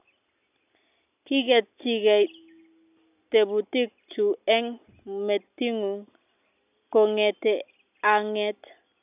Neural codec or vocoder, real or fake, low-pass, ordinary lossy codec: none; real; 3.6 kHz; none